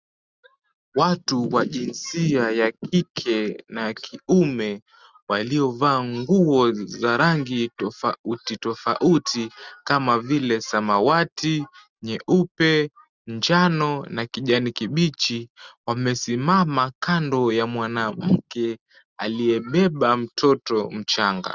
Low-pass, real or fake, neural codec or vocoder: 7.2 kHz; real; none